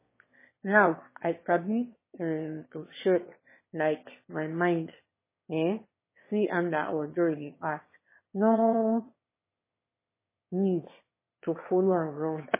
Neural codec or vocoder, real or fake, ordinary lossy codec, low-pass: autoencoder, 22.05 kHz, a latent of 192 numbers a frame, VITS, trained on one speaker; fake; MP3, 16 kbps; 3.6 kHz